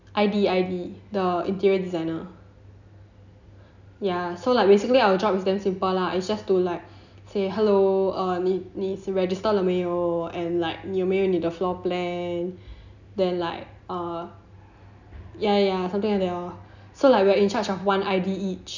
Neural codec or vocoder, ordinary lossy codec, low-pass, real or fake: none; none; 7.2 kHz; real